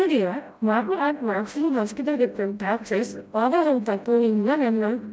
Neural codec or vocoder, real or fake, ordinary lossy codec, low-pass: codec, 16 kHz, 0.5 kbps, FreqCodec, smaller model; fake; none; none